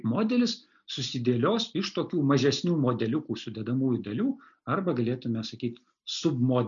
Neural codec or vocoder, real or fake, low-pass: none; real; 7.2 kHz